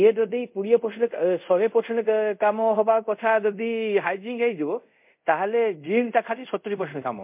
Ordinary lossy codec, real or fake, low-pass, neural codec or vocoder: MP3, 32 kbps; fake; 3.6 kHz; codec, 24 kHz, 0.5 kbps, DualCodec